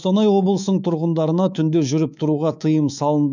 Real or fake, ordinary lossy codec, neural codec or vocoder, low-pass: fake; none; codec, 24 kHz, 3.1 kbps, DualCodec; 7.2 kHz